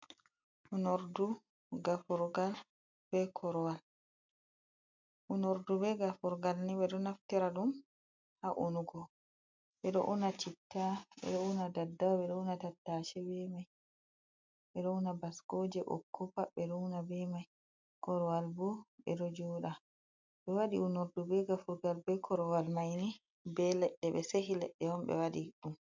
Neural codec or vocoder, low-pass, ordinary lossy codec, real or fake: none; 7.2 kHz; MP3, 64 kbps; real